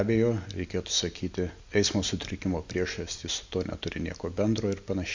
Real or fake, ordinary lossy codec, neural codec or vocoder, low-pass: real; MP3, 64 kbps; none; 7.2 kHz